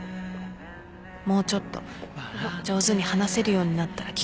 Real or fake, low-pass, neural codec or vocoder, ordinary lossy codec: real; none; none; none